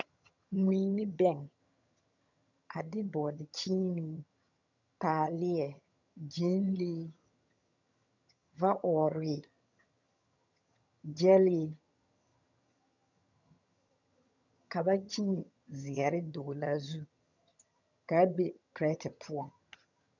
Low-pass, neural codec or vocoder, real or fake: 7.2 kHz; vocoder, 22.05 kHz, 80 mel bands, HiFi-GAN; fake